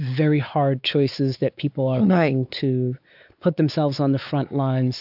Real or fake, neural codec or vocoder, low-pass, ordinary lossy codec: fake; codec, 16 kHz, 4 kbps, X-Codec, WavLM features, trained on Multilingual LibriSpeech; 5.4 kHz; AAC, 48 kbps